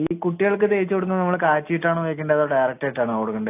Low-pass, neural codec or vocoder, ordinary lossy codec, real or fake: 3.6 kHz; none; AAC, 24 kbps; real